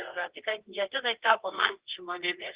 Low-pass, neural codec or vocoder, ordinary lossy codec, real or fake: 3.6 kHz; codec, 24 kHz, 0.9 kbps, WavTokenizer, medium music audio release; Opus, 16 kbps; fake